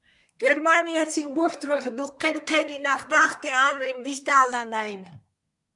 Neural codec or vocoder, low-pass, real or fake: codec, 24 kHz, 1 kbps, SNAC; 10.8 kHz; fake